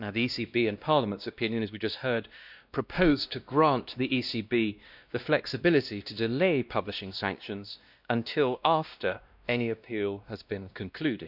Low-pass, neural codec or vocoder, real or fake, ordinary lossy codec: 5.4 kHz; codec, 16 kHz, 1 kbps, X-Codec, WavLM features, trained on Multilingual LibriSpeech; fake; none